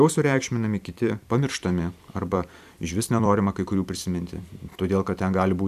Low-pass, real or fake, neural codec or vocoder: 14.4 kHz; fake; vocoder, 44.1 kHz, 128 mel bands every 256 samples, BigVGAN v2